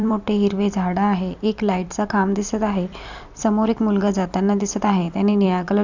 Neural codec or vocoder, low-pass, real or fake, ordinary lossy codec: vocoder, 44.1 kHz, 128 mel bands every 256 samples, BigVGAN v2; 7.2 kHz; fake; none